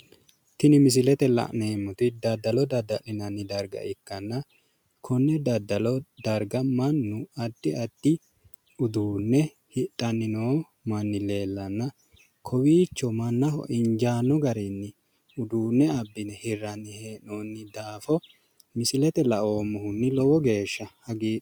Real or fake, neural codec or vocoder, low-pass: real; none; 19.8 kHz